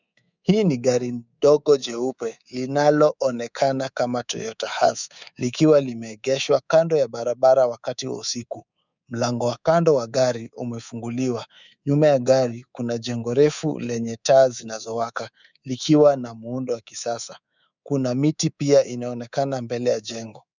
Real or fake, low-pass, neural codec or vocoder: fake; 7.2 kHz; codec, 24 kHz, 3.1 kbps, DualCodec